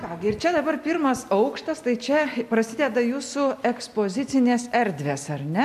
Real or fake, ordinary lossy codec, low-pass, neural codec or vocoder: real; MP3, 96 kbps; 14.4 kHz; none